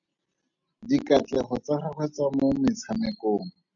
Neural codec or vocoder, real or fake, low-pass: none; real; 7.2 kHz